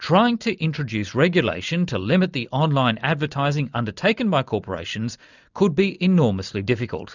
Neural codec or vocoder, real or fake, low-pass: none; real; 7.2 kHz